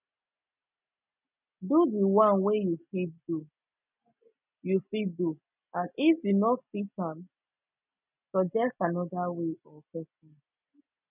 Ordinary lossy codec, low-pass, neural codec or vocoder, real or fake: none; 3.6 kHz; none; real